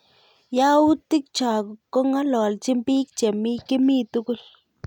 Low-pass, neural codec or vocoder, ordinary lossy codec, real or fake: 19.8 kHz; none; none; real